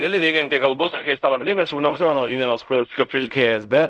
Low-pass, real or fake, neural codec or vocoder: 10.8 kHz; fake; codec, 16 kHz in and 24 kHz out, 0.4 kbps, LongCat-Audio-Codec, fine tuned four codebook decoder